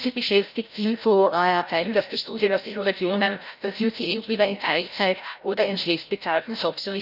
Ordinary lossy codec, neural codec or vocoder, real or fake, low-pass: none; codec, 16 kHz, 0.5 kbps, FreqCodec, larger model; fake; 5.4 kHz